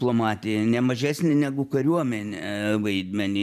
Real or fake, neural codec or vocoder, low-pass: fake; vocoder, 44.1 kHz, 128 mel bands every 512 samples, BigVGAN v2; 14.4 kHz